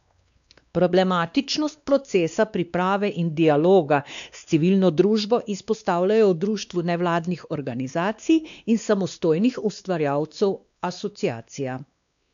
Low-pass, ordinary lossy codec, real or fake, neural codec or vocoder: 7.2 kHz; none; fake; codec, 16 kHz, 2 kbps, X-Codec, WavLM features, trained on Multilingual LibriSpeech